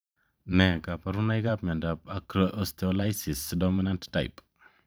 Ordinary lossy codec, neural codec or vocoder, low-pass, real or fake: none; vocoder, 44.1 kHz, 128 mel bands every 512 samples, BigVGAN v2; none; fake